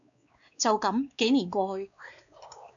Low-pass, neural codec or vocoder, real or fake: 7.2 kHz; codec, 16 kHz, 2 kbps, X-Codec, WavLM features, trained on Multilingual LibriSpeech; fake